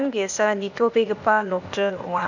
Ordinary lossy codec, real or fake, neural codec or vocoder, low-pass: none; fake; codec, 16 kHz, 0.8 kbps, ZipCodec; 7.2 kHz